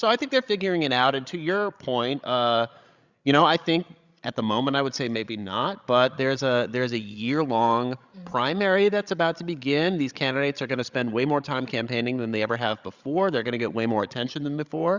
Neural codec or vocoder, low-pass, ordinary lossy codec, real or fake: codec, 16 kHz, 16 kbps, FreqCodec, larger model; 7.2 kHz; Opus, 64 kbps; fake